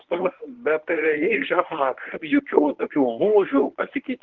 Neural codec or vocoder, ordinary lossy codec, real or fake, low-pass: codec, 24 kHz, 0.9 kbps, WavTokenizer, medium speech release version 1; Opus, 16 kbps; fake; 7.2 kHz